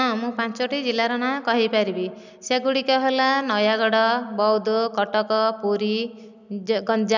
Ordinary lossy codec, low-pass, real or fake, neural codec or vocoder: none; 7.2 kHz; real; none